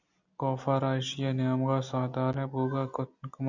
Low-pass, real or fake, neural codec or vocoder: 7.2 kHz; real; none